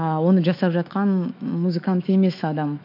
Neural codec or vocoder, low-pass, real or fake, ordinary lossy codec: autoencoder, 48 kHz, 128 numbers a frame, DAC-VAE, trained on Japanese speech; 5.4 kHz; fake; MP3, 48 kbps